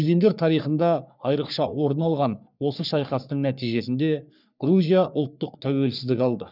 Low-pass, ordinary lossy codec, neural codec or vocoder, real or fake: 5.4 kHz; none; codec, 44.1 kHz, 3.4 kbps, Pupu-Codec; fake